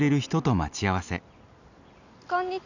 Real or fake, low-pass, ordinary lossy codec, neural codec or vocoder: real; 7.2 kHz; none; none